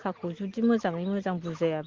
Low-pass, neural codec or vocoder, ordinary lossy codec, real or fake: 7.2 kHz; none; Opus, 16 kbps; real